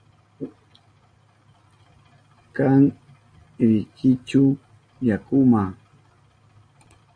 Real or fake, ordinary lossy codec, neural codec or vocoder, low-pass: real; AAC, 48 kbps; none; 9.9 kHz